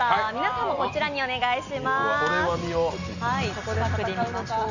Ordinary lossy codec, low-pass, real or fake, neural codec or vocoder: none; 7.2 kHz; real; none